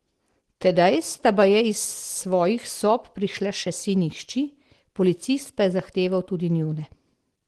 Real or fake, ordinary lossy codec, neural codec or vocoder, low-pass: real; Opus, 16 kbps; none; 10.8 kHz